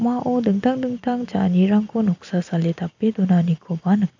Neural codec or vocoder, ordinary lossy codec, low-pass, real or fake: none; AAC, 48 kbps; 7.2 kHz; real